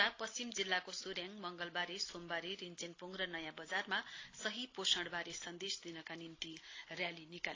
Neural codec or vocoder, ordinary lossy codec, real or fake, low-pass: none; AAC, 32 kbps; real; 7.2 kHz